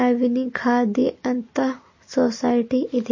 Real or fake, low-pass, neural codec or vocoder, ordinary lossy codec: real; 7.2 kHz; none; MP3, 32 kbps